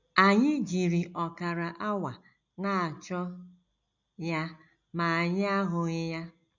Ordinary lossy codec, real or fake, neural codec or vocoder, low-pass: none; real; none; 7.2 kHz